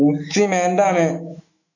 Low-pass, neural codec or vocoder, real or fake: 7.2 kHz; autoencoder, 48 kHz, 128 numbers a frame, DAC-VAE, trained on Japanese speech; fake